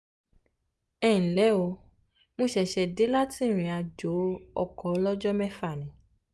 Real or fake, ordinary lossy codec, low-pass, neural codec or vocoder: real; none; none; none